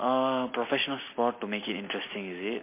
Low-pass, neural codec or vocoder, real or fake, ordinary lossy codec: 3.6 kHz; none; real; MP3, 24 kbps